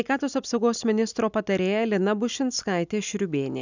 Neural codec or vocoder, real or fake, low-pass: none; real; 7.2 kHz